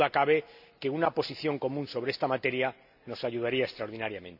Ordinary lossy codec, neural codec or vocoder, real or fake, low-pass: none; none; real; 5.4 kHz